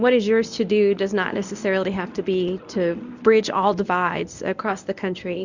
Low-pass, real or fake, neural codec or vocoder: 7.2 kHz; fake; codec, 24 kHz, 0.9 kbps, WavTokenizer, medium speech release version 1